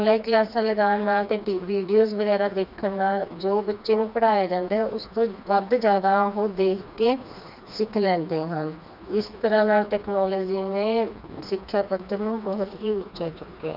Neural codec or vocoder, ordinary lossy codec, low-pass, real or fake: codec, 16 kHz, 2 kbps, FreqCodec, smaller model; none; 5.4 kHz; fake